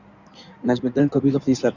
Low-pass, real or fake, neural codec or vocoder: 7.2 kHz; fake; codec, 16 kHz in and 24 kHz out, 2.2 kbps, FireRedTTS-2 codec